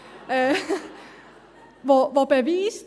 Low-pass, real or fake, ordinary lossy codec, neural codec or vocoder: none; real; none; none